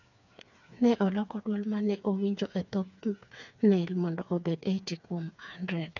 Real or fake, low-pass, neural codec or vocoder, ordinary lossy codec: fake; 7.2 kHz; codec, 16 kHz, 4 kbps, FreqCodec, smaller model; none